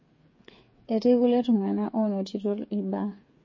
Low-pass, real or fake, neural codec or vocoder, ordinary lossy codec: 7.2 kHz; fake; codec, 16 kHz, 8 kbps, FreqCodec, smaller model; MP3, 32 kbps